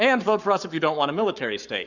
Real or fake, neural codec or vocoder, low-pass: fake; codec, 44.1 kHz, 7.8 kbps, Pupu-Codec; 7.2 kHz